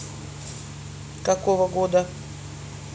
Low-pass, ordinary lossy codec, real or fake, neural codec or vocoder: none; none; real; none